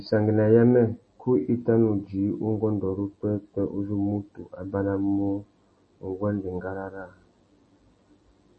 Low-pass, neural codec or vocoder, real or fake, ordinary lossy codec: 10.8 kHz; none; real; MP3, 32 kbps